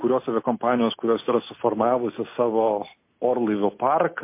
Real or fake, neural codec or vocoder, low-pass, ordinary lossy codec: real; none; 3.6 kHz; MP3, 24 kbps